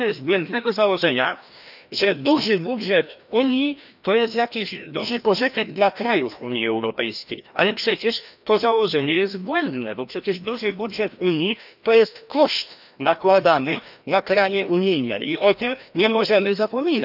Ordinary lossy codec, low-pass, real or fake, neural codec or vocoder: none; 5.4 kHz; fake; codec, 16 kHz, 1 kbps, FreqCodec, larger model